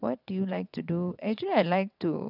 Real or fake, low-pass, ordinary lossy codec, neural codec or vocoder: fake; 5.4 kHz; none; vocoder, 22.05 kHz, 80 mel bands, WaveNeXt